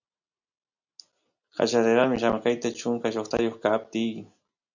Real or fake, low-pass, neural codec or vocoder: real; 7.2 kHz; none